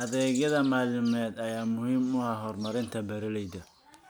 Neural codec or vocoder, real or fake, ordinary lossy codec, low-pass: none; real; none; none